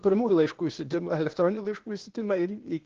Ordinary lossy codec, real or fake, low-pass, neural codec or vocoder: Opus, 16 kbps; fake; 7.2 kHz; codec, 16 kHz, 0.8 kbps, ZipCodec